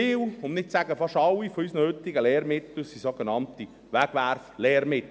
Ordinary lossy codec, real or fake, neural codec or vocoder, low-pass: none; real; none; none